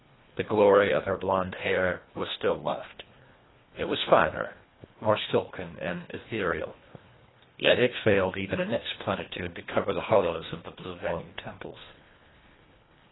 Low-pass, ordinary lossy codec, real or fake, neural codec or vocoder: 7.2 kHz; AAC, 16 kbps; fake; codec, 24 kHz, 1.5 kbps, HILCodec